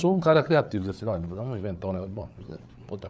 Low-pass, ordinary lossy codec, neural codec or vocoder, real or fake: none; none; codec, 16 kHz, 8 kbps, FreqCodec, larger model; fake